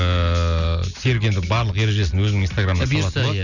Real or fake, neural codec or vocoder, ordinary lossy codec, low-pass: real; none; none; 7.2 kHz